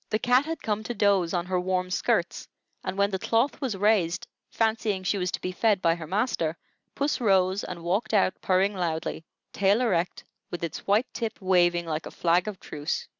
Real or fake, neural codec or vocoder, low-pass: real; none; 7.2 kHz